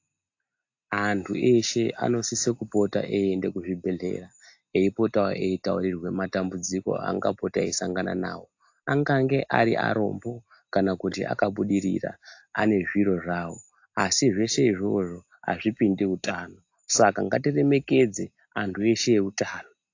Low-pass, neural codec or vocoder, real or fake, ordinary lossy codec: 7.2 kHz; none; real; AAC, 48 kbps